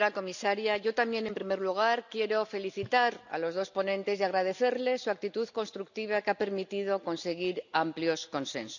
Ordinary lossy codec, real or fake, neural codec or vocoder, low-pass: none; real; none; 7.2 kHz